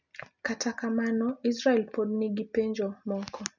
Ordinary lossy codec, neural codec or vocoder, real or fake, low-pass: none; none; real; 7.2 kHz